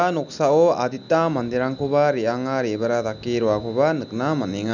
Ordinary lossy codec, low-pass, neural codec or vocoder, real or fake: none; 7.2 kHz; none; real